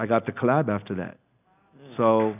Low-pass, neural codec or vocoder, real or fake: 3.6 kHz; none; real